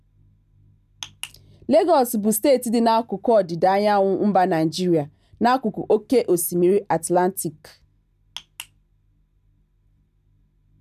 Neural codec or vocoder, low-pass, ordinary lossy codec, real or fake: none; 14.4 kHz; none; real